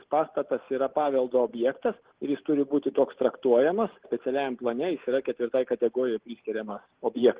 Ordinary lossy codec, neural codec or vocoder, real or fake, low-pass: Opus, 16 kbps; none; real; 3.6 kHz